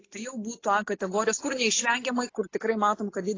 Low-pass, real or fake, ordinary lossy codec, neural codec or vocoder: 7.2 kHz; real; AAC, 32 kbps; none